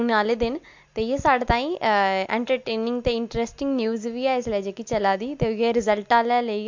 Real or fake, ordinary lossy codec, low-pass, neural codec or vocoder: real; MP3, 48 kbps; 7.2 kHz; none